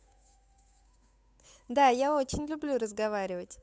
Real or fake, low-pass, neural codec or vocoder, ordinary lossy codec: fake; none; codec, 16 kHz, 8 kbps, FunCodec, trained on Chinese and English, 25 frames a second; none